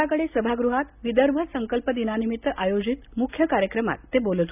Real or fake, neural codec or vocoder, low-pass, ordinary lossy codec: real; none; 3.6 kHz; none